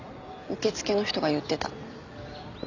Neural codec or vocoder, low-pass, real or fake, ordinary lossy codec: none; 7.2 kHz; real; none